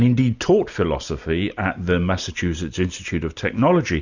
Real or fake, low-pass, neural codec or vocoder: real; 7.2 kHz; none